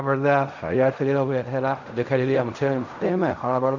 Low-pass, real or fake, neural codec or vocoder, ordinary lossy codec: 7.2 kHz; fake; codec, 16 kHz in and 24 kHz out, 0.4 kbps, LongCat-Audio-Codec, fine tuned four codebook decoder; none